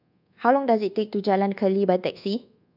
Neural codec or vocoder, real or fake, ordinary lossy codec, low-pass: codec, 24 kHz, 1.2 kbps, DualCodec; fake; none; 5.4 kHz